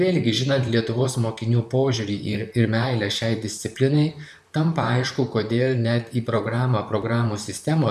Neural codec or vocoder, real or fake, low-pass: vocoder, 44.1 kHz, 128 mel bands, Pupu-Vocoder; fake; 14.4 kHz